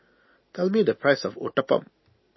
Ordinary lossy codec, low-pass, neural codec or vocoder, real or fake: MP3, 24 kbps; 7.2 kHz; none; real